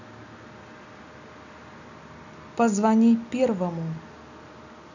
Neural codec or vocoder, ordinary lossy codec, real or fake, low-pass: none; none; real; 7.2 kHz